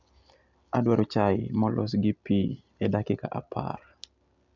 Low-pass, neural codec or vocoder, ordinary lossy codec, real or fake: 7.2 kHz; none; none; real